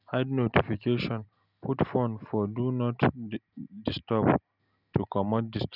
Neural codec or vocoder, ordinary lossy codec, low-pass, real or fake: none; none; 5.4 kHz; real